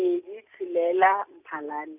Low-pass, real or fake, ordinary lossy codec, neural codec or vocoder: 3.6 kHz; real; none; none